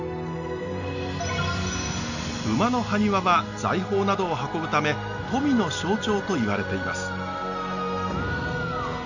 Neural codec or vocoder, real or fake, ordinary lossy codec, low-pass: none; real; none; 7.2 kHz